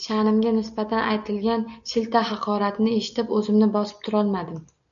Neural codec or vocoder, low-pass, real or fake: none; 7.2 kHz; real